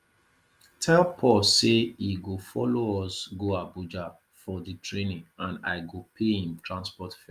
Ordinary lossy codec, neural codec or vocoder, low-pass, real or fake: Opus, 32 kbps; none; 14.4 kHz; real